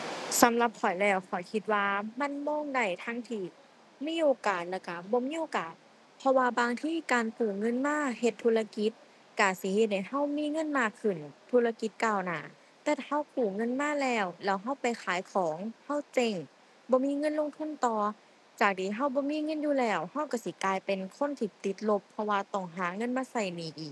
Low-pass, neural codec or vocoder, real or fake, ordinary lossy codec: none; none; real; none